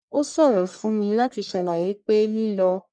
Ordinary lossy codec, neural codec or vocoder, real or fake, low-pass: none; codec, 44.1 kHz, 1.7 kbps, Pupu-Codec; fake; 9.9 kHz